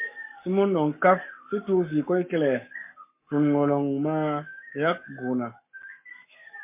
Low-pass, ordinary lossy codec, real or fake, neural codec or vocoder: 3.6 kHz; MP3, 24 kbps; fake; codec, 44.1 kHz, 7.8 kbps, Pupu-Codec